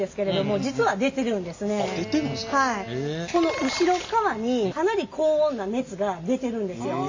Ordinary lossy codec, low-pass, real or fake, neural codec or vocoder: AAC, 48 kbps; 7.2 kHz; real; none